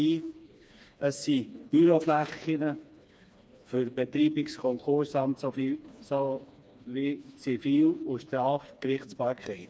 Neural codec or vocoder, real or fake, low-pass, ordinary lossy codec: codec, 16 kHz, 2 kbps, FreqCodec, smaller model; fake; none; none